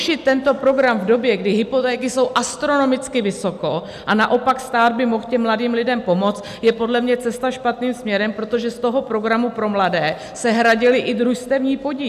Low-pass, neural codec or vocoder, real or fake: 14.4 kHz; none; real